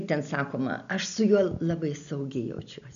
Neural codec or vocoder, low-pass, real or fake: none; 7.2 kHz; real